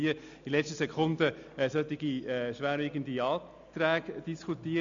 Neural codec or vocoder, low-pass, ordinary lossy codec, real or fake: none; 7.2 kHz; MP3, 64 kbps; real